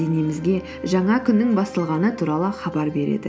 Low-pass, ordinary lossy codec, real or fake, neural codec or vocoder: none; none; real; none